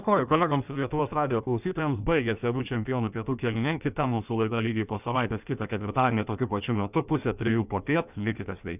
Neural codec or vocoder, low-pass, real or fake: codec, 16 kHz in and 24 kHz out, 1.1 kbps, FireRedTTS-2 codec; 3.6 kHz; fake